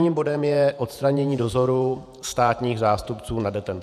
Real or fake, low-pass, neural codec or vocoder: fake; 14.4 kHz; vocoder, 48 kHz, 128 mel bands, Vocos